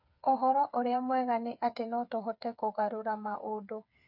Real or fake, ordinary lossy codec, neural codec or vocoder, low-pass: fake; none; codec, 16 kHz, 8 kbps, FreqCodec, smaller model; 5.4 kHz